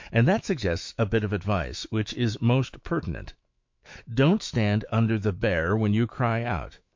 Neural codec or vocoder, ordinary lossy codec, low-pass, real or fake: autoencoder, 48 kHz, 128 numbers a frame, DAC-VAE, trained on Japanese speech; MP3, 48 kbps; 7.2 kHz; fake